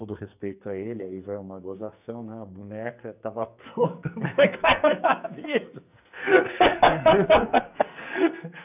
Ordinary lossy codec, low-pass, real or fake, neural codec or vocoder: none; 3.6 kHz; fake; codec, 44.1 kHz, 2.6 kbps, SNAC